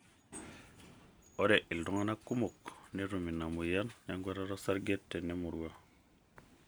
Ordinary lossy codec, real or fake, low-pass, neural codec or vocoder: none; real; none; none